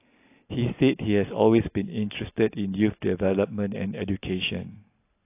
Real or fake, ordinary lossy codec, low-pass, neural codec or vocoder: real; AAC, 24 kbps; 3.6 kHz; none